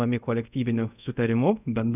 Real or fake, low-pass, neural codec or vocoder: fake; 3.6 kHz; codec, 24 kHz, 0.5 kbps, DualCodec